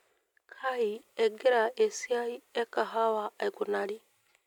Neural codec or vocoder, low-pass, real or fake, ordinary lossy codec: none; 19.8 kHz; real; none